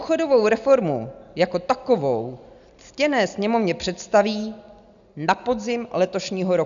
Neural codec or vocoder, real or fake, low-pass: none; real; 7.2 kHz